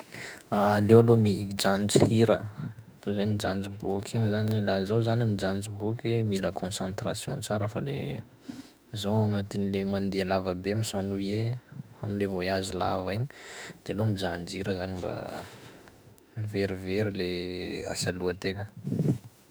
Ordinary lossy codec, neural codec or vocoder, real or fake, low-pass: none; autoencoder, 48 kHz, 32 numbers a frame, DAC-VAE, trained on Japanese speech; fake; none